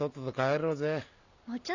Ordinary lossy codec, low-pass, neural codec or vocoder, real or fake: none; 7.2 kHz; none; real